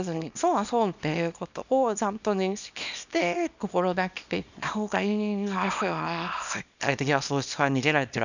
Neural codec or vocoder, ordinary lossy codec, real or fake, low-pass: codec, 24 kHz, 0.9 kbps, WavTokenizer, small release; none; fake; 7.2 kHz